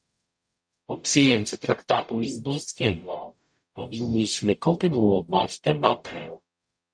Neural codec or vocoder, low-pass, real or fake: codec, 44.1 kHz, 0.9 kbps, DAC; 9.9 kHz; fake